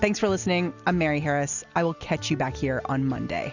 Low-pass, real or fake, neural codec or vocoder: 7.2 kHz; real; none